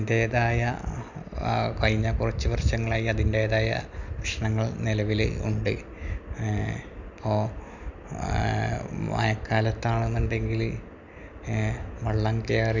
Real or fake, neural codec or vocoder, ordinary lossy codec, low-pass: real; none; none; 7.2 kHz